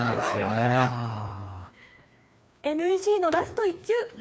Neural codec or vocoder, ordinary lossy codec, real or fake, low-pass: codec, 16 kHz, 2 kbps, FreqCodec, larger model; none; fake; none